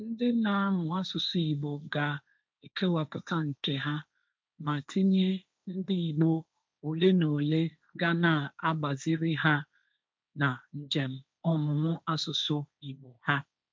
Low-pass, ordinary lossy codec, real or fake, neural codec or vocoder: none; none; fake; codec, 16 kHz, 1.1 kbps, Voila-Tokenizer